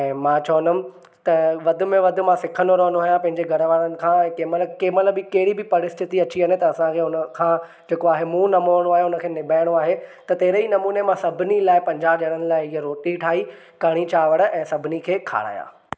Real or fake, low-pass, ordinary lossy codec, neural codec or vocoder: real; none; none; none